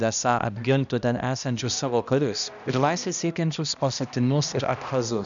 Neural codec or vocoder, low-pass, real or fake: codec, 16 kHz, 1 kbps, X-Codec, HuBERT features, trained on balanced general audio; 7.2 kHz; fake